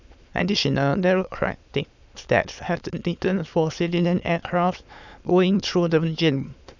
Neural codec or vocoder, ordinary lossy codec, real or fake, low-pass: autoencoder, 22.05 kHz, a latent of 192 numbers a frame, VITS, trained on many speakers; none; fake; 7.2 kHz